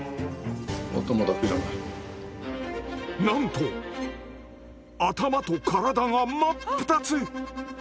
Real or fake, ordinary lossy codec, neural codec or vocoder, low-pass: real; none; none; none